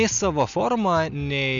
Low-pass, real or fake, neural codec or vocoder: 7.2 kHz; real; none